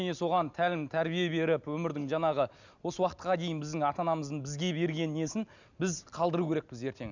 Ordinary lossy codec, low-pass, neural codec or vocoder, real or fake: none; 7.2 kHz; none; real